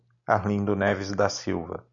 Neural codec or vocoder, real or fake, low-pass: none; real; 7.2 kHz